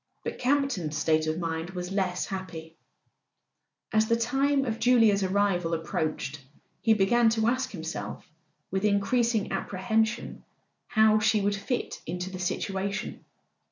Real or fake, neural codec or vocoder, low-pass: real; none; 7.2 kHz